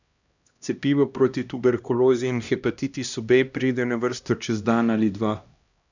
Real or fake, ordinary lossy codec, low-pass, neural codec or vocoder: fake; none; 7.2 kHz; codec, 16 kHz, 1 kbps, X-Codec, HuBERT features, trained on LibriSpeech